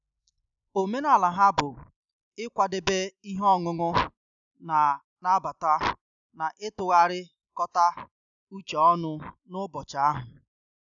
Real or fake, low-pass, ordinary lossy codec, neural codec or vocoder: real; 7.2 kHz; none; none